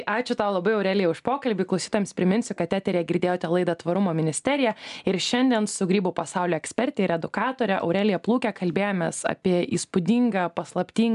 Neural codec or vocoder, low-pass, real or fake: none; 10.8 kHz; real